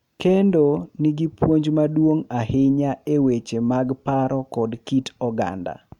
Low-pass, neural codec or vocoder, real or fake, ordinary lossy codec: 19.8 kHz; none; real; MP3, 96 kbps